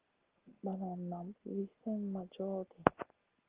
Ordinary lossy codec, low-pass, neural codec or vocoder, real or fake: Opus, 16 kbps; 3.6 kHz; none; real